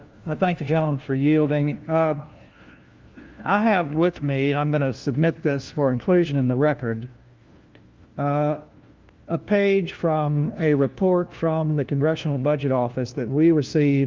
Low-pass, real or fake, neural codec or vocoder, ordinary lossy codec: 7.2 kHz; fake; codec, 16 kHz, 1 kbps, FunCodec, trained on LibriTTS, 50 frames a second; Opus, 32 kbps